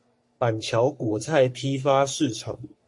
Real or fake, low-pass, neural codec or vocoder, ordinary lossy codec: fake; 10.8 kHz; codec, 44.1 kHz, 3.4 kbps, Pupu-Codec; MP3, 48 kbps